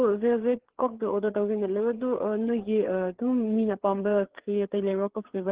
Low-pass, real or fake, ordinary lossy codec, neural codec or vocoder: 3.6 kHz; fake; Opus, 16 kbps; codec, 16 kHz, 4 kbps, FreqCodec, larger model